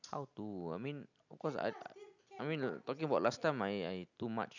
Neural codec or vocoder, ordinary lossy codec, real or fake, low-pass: none; none; real; 7.2 kHz